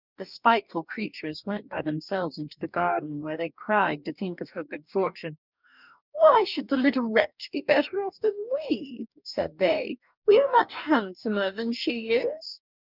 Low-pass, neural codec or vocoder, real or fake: 5.4 kHz; codec, 44.1 kHz, 2.6 kbps, DAC; fake